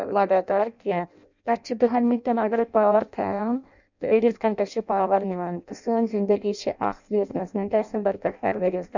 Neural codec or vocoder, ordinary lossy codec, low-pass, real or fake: codec, 16 kHz in and 24 kHz out, 0.6 kbps, FireRedTTS-2 codec; none; 7.2 kHz; fake